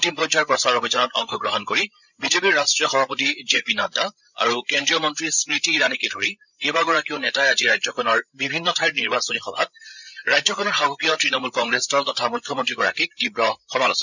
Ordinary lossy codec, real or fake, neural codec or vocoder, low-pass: none; fake; codec, 16 kHz, 16 kbps, FreqCodec, larger model; 7.2 kHz